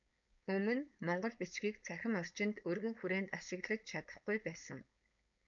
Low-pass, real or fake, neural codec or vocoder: 7.2 kHz; fake; codec, 16 kHz, 4.8 kbps, FACodec